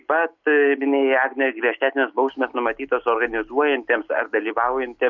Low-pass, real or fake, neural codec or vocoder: 7.2 kHz; real; none